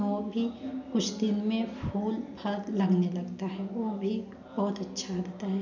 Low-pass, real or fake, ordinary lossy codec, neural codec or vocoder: 7.2 kHz; real; none; none